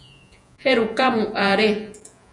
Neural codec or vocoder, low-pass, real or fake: vocoder, 48 kHz, 128 mel bands, Vocos; 10.8 kHz; fake